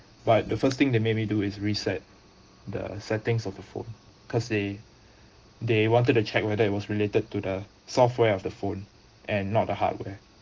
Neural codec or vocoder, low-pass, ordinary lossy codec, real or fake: none; 7.2 kHz; Opus, 16 kbps; real